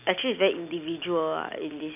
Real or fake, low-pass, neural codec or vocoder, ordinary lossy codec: real; 3.6 kHz; none; none